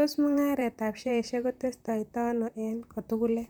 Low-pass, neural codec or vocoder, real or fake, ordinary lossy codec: none; vocoder, 44.1 kHz, 128 mel bands, Pupu-Vocoder; fake; none